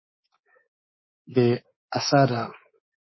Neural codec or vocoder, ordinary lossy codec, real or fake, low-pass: codec, 24 kHz, 3.1 kbps, DualCodec; MP3, 24 kbps; fake; 7.2 kHz